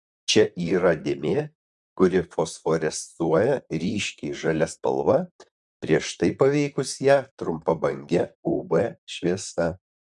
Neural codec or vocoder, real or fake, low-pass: vocoder, 44.1 kHz, 128 mel bands, Pupu-Vocoder; fake; 10.8 kHz